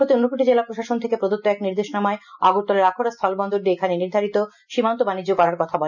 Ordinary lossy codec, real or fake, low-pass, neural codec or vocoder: none; real; 7.2 kHz; none